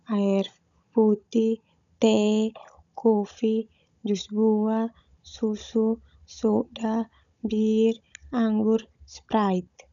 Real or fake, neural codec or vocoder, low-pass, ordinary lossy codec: fake; codec, 16 kHz, 16 kbps, FunCodec, trained on Chinese and English, 50 frames a second; 7.2 kHz; none